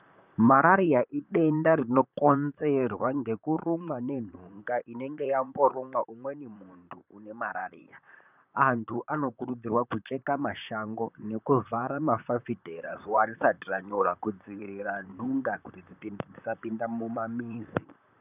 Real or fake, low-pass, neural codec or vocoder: fake; 3.6 kHz; vocoder, 44.1 kHz, 128 mel bands, Pupu-Vocoder